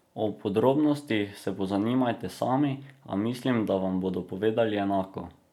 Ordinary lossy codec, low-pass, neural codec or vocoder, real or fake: none; 19.8 kHz; none; real